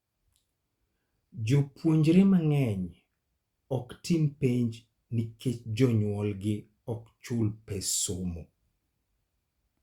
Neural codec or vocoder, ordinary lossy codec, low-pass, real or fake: none; Opus, 64 kbps; 19.8 kHz; real